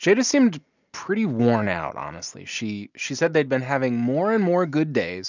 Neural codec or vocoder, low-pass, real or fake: none; 7.2 kHz; real